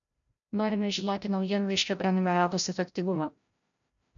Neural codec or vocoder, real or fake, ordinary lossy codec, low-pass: codec, 16 kHz, 0.5 kbps, FreqCodec, larger model; fake; MP3, 96 kbps; 7.2 kHz